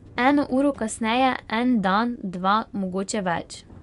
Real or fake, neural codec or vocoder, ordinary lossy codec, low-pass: fake; vocoder, 24 kHz, 100 mel bands, Vocos; none; 10.8 kHz